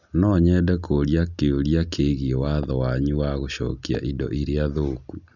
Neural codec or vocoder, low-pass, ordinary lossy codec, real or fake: none; 7.2 kHz; none; real